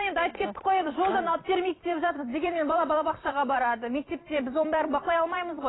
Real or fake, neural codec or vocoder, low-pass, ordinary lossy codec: fake; vocoder, 44.1 kHz, 128 mel bands every 256 samples, BigVGAN v2; 7.2 kHz; AAC, 16 kbps